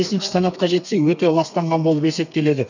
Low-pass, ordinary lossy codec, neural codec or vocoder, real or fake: 7.2 kHz; none; codec, 32 kHz, 1.9 kbps, SNAC; fake